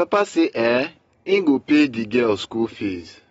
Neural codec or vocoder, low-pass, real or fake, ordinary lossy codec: none; 10.8 kHz; real; AAC, 24 kbps